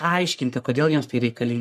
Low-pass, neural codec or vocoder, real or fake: 14.4 kHz; codec, 44.1 kHz, 3.4 kbps, Pupu-Codec; fake